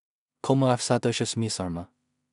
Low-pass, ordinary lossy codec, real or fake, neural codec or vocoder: 10.8 kHz; none; fake; codec, 16 kHz in and 24 kHz out, 0.4 kbps, LongCat-Audio-Codec, two codebook decoder